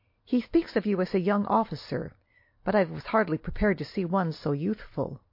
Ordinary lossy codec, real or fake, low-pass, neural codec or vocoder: MP3, 32 kbps; real; 5.4 kHz; none